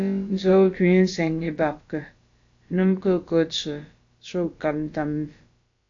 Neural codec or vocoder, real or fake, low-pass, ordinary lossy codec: codec, 16 kHz, about 1 kbps, DyCAST, with the encoder's durations; fake; 7.2 kHz; AAC, 48 kbps